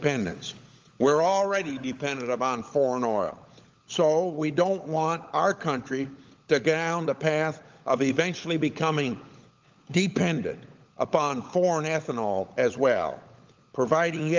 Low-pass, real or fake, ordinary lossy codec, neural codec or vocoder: 7.2 kHz; fake; Opus, 32 kbps; codec, 16 kHz, 16 kbps, FunCodec, trained on LibriTTS, 50 frames a second